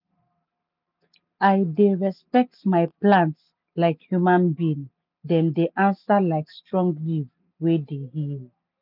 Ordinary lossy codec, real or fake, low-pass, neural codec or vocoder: none; real; 5.4 kHz; none